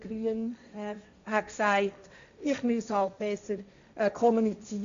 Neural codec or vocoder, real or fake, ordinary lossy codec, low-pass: codec, 16 kHz, 1.1 kbps, Voila-Tokenizer; fake; MP3, 64 kbps; 7.2 kHz